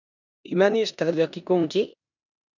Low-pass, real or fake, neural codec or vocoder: 7.2 kHz; fake; codec, 16 kHz in and 24 kHz out, 0.9 kbps, LongCat-Audio-Codec, four codebook decoder